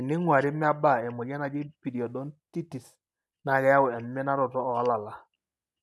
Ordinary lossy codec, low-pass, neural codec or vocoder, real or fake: none; none; none; real